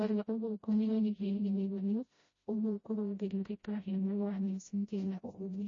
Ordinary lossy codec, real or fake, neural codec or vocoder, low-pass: MP3, 32 kbps; fake; codec, 16 kHz, 0.5 kbps, FreqCodec, smaller model; 7.2 kHz